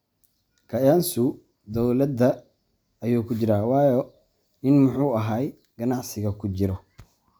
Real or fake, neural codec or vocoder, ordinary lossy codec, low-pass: real; none; none; none